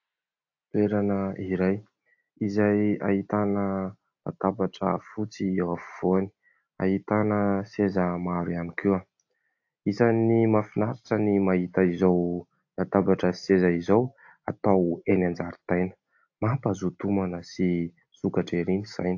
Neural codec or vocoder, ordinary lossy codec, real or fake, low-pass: none; AAC, 48 kbps; real; 7.2 kHz